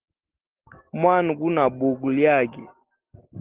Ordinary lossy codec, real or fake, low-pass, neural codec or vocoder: Opus, 16 kbps; real; 3.6 kHz; none